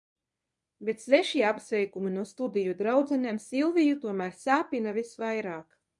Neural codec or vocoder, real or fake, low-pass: codec, 24 kHz, 0.9 kbps, WavTokenizer, medium speech release version 1; fake; 10.8 kHz